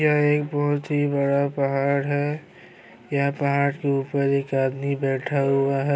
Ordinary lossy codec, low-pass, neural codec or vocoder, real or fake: none; none; none; real